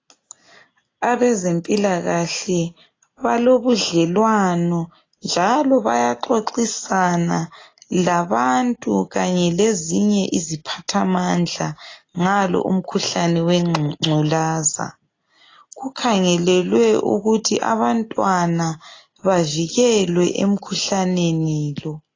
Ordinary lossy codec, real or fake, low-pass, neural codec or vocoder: AAC, 32 kbps; real; 7.2 kHz; none